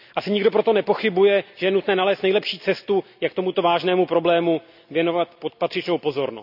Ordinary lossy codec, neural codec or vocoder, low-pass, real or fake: none; none; 5.4 kHz; real